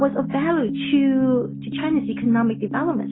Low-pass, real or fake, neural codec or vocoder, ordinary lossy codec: 7.2 kHz; real; none; AAC, 16 kbps